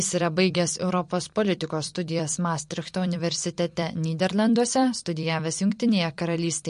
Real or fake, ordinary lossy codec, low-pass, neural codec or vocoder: fake; MP3, 48 kbps; 14.4 kHz; vocoder, 44.1 kHz, 128 mel bands every 256 samples, BigVGAN v2